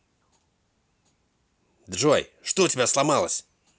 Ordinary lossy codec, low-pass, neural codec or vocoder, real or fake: none; none; none; real